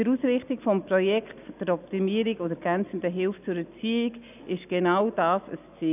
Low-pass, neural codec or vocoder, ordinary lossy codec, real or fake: 3.6 kHz; none; none; real